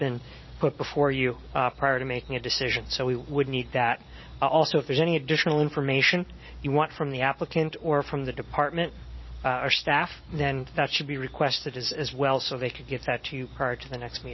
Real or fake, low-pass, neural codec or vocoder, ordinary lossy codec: real; 7.2 kHz; none; MP3, 24 kbps